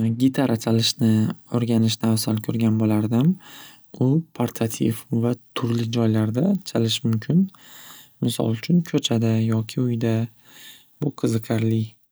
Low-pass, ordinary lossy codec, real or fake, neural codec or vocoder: none; none; real; none